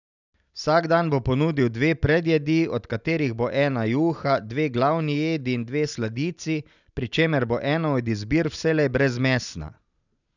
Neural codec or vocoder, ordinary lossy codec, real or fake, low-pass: none; none; real; 7.2 kHz